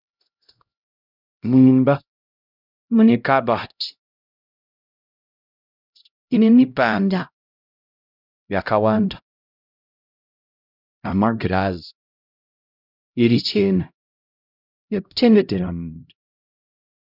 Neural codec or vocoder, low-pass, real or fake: codec, 16 kHz, 0.5 kbps, X-Codec, HuBERT features, trained on LibriSpeech; 5.4 kHz; fake